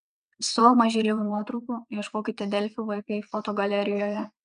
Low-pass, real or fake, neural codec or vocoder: 9.9 kHz; fake; vocoder, 22.05 kHz, 80 mel bands, WaveNeXt